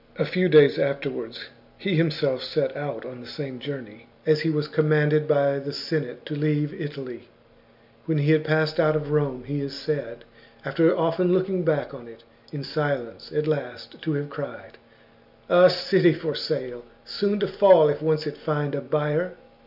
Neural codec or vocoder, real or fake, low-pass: none; real; 5.4 kHz